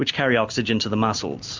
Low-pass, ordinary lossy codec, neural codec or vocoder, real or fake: 7.2 kHz; MP3, 64 kbps; none; real